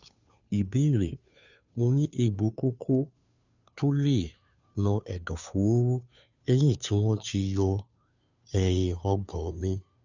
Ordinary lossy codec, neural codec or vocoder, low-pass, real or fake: none; codec, 16 kHz, 2 kbps, FunCodec, trained on Chinese and English, 25 frames a second; 7.2 kHz; fake